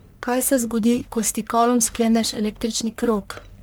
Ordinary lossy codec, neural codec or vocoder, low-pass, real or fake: none; codec, 44.1 kHz, 1.7 kbps, Pupu-Codec; none; fake